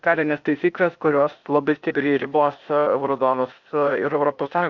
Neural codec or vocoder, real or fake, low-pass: codec, 16 kHz, 0.8 kbps, ZipCodec; fake; 7.2 kHz